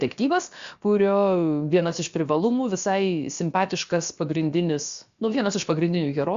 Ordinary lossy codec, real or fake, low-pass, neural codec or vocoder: Opus, 64 kbps; fake; 7.2 kHz; codec, 16 kHz, about 1 kbps, DyCAST, with the encoder's durations